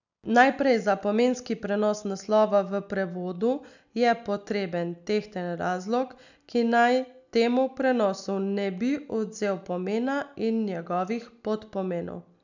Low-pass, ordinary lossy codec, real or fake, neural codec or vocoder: 7.2 kHz; none; real; none